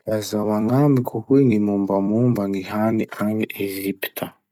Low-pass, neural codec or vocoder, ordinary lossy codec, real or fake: 19.8 kHz; vocoder, 44.1 kHz, 128 mel bands every 256 samples, BigVGAN v2; none; fake